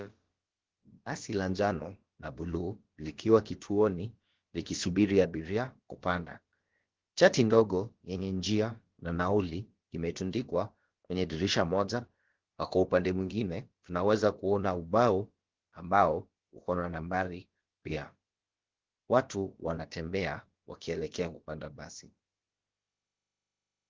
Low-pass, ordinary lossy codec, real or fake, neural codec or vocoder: 7.2 kHz; Opus, 16 kbps; fake; codec, 16 kHz, about 1 kbps, DyCAST, with the encoder's durations